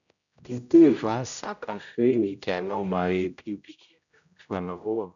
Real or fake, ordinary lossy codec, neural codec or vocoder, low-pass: fake; none; codec, 16 kHz, 0.5 kbps, X-Codec, HuBERT features, trained on general audio; 7.2 kHz